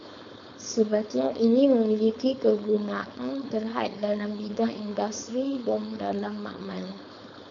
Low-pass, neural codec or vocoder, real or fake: 7.2 kHz; codec, 16 kHz, 4.8 kbps, FACodec; fake